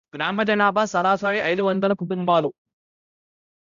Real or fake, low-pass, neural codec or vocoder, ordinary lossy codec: fake; 7.2 kHz; codec, 16 kHz, 0.5 kbps, X-Codec, HuBERT features, trained on balanced general audio; none